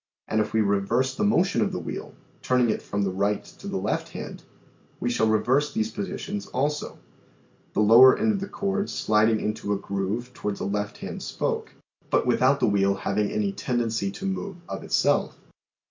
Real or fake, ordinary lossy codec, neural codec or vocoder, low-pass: real; MP3, 48 kbps; none; 7.2 kHz